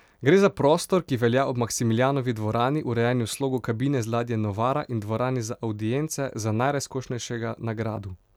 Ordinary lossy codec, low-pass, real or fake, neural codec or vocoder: none; 19.8 kHz; real; none